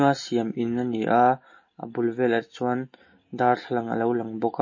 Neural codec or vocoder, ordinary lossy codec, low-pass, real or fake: none; MP3, 32 kbps; 7.2 kHz; real